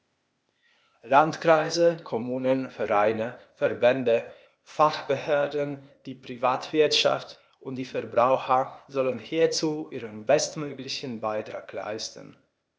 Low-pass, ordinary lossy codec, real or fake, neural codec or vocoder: none; none; fake; codec, 16 kHz, 0.8 kbps, ZipCodec